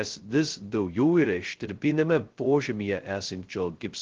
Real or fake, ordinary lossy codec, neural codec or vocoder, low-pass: fake; Opus, 16 kbps; codec, 16 kHz, 0.2 kbps, FocalCodec; 7.2 kHz